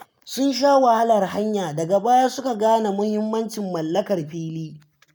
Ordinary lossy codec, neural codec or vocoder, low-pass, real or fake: none; none; none; real